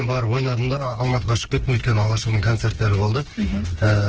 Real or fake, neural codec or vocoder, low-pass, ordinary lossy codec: fake; codec, 16 kHz, 4 kbps, FreqCodec, smaller model; 7.2 kHz; Opus, 16 kbps